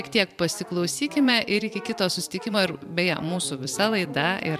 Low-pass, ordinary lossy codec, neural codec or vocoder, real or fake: 14.4 kHz; MP3, 96 kbps; vocoder, 44.1 kHz, 128 mel bands every 256 samples, BigVGAN v2; fake